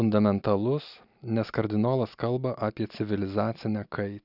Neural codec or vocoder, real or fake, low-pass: none; real; 5.4 kHz